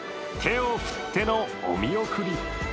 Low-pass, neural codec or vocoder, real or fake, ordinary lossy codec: none; none; real; none